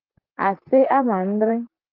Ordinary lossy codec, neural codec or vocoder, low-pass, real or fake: Opus, 24 kbps; vocoder, 44.1 kHz, 128 mel bands, Pupu-Vocoder; 5.4 kHz; fake